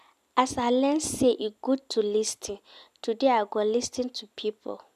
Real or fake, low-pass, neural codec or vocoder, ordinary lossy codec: real; 14.4 kHz; none; none